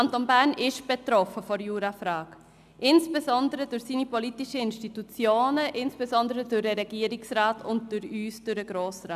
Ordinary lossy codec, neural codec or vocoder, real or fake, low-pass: none; none; real; 14.4 kHz